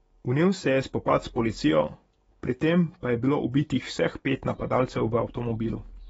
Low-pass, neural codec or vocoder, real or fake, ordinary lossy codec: 19.8 kHz; codec, 44.1 kHz, 7.8 kbps, DAC; fake; AAC, 24 kbps